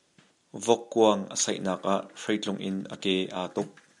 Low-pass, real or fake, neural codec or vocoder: 10.8 kHz; real; none